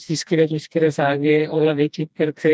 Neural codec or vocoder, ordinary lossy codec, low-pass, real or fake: codec, 16 kHz, 1 kbps, FreqCodec, smaller model; none; none; fake